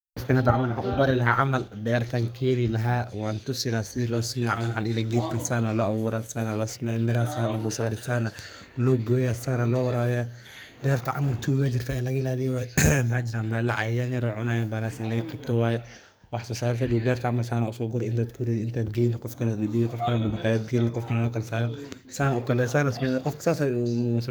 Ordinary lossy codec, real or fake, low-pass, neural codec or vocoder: none; fake; none; codec, 44.1 kHz, 2.6 kbps, SNAC